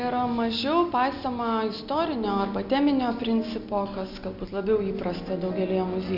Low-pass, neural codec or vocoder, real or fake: 5.4 kHz; none; real